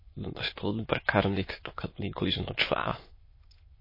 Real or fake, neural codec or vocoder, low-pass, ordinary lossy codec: fake; autoencoder, 22.05 kHz, a latent of 192 numbers a frame, VITS, trained on many speakers; 5.4 kHz; MP3, 24 kbps